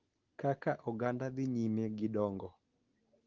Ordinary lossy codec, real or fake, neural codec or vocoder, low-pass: Opus, 16 kbps; real; none; 7.2 kHz